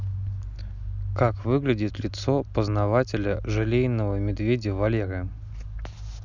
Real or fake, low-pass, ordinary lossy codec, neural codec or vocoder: real; 7.2 kHz; none; none